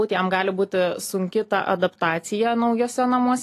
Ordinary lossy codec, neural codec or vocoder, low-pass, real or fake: AAC, 48 kbps; none; 14.4 kHz; real